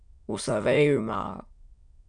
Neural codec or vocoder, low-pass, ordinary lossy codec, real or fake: autoencoder, 22.05 kHz, a latent of 192 numbers a frame, VITS, trained on many speakers; 9.9 kHz; MP3, 96 kbps; fake